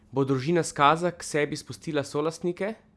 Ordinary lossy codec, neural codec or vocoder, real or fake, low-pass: none; none; real; none